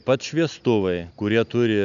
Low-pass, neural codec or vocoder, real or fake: 7.2 kHz; none; real